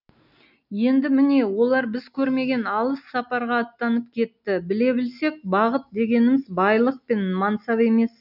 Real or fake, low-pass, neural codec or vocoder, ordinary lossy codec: real; 5.4 kHz; none; none